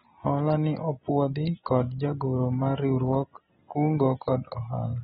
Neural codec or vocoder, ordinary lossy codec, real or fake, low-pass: none; AAC, 16 kbps; real; 19.8 kHz